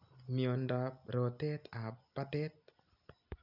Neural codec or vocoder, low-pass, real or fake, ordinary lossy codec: none; 5.4 kHz; real; none